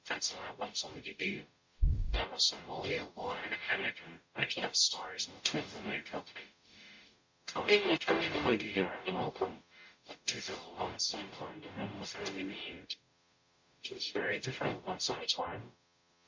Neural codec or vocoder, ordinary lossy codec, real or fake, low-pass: codec, 44.1 kHz, 0.9 kbps, DAC; MP3, 48 kbps; fake; 7.2 kHz